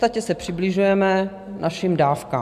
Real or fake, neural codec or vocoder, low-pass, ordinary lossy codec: real; none; 14.4 kHz; MP3, 96 kbps